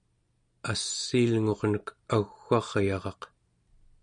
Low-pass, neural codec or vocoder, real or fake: 9.9 kHz; none; real